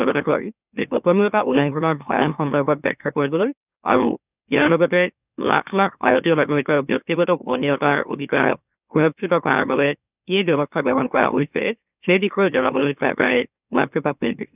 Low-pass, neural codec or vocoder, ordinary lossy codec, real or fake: 3.6 kHz; autoencoder, 44.1 kHz, a latent of 192 numbers a frame, MeloTTS; none; fake